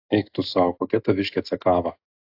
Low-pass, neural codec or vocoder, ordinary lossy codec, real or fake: 5.4 kHz; none; AAC, 48 kbps; real